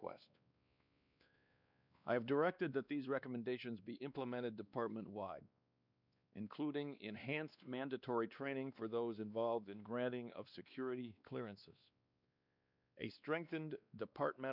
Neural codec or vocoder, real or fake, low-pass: codec, 16 kHz, 2 kbps, X-Codec, WavLM features, trained on Multilingual LibriSpeech; fake; 5.4 kHz